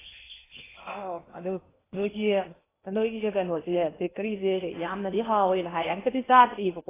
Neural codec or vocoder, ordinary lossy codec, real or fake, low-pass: codec, 16 kHz in and 24 kHz out, 0.8 kbps, FocalCodec, streaming, 65536 codes; AAC, 16 kbps; fake; 3.6 kHz